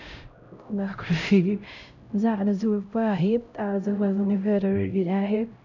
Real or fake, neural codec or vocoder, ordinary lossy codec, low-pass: fake; codec, 16 kHz, 0.5 kbps, X-Codec, HuBERT features, trained on LibriSpeech; none; 7.2 kHz